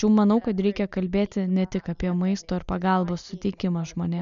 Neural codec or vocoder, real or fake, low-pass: none; real; 7.2 kHz